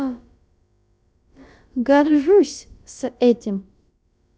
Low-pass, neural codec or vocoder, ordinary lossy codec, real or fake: none; codec, 16 kHz, about 1 kbps, DyCAST, with the encoder's durations; none; fake